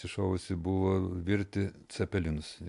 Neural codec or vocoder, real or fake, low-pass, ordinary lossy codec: none; real; 10.8 kHz; AAC, 64 kbps